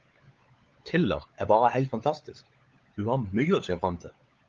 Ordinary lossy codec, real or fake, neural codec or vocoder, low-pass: Opus, 32 kbps; fake; codec, 16 kHz, 16 kbps, FunCodec, trained on LibriTTS, 50 frames a second; 7.2 kHz